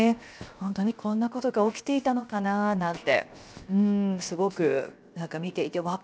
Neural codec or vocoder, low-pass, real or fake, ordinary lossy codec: codec, 16 kHz, 0.7 kbps, FocalCodec; none; fake; none